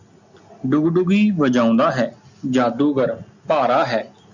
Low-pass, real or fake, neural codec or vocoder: 7.2 kHz; real; none